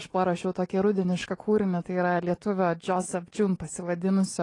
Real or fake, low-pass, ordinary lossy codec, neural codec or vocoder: real; 10.8 kHz; AAC, 32 kbps; none